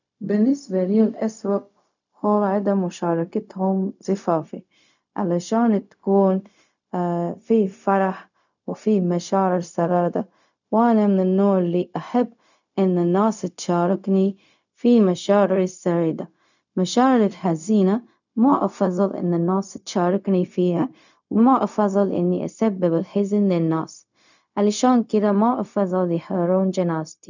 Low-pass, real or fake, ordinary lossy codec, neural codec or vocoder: 7.2 kHz; fake; none; codec, 16 kHz, 0.4 kbps, LongCat-Audio-Codec